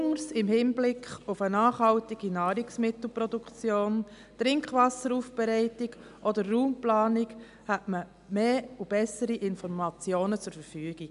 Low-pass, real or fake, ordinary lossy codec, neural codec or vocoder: 10.8 kHz; real; none; none